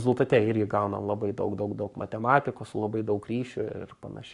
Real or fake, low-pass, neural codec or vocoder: fake; 10.8 kHz; codec, 44.1 kHz, 7.8 kbps, Pupu-Codec